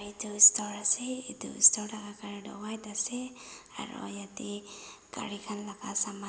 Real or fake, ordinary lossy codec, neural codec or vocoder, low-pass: real; none; none; none